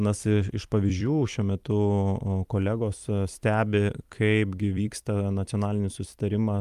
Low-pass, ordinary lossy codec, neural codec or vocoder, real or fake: 14.4 kHz; Opus, 32 kbps; vocoder, 44.1 kHz, 128 mel bands every 256 samples, BigVGAN v2; fake